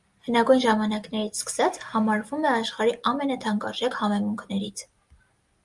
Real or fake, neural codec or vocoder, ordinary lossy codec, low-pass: real; none; Opus, 32 kbps; 10.8 kHz